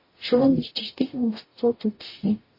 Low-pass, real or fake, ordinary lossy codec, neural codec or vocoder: 5.4 kHz; fake; MP3, 24 kbps; codec, 44.1 kHz, 0.9 kbps, DAC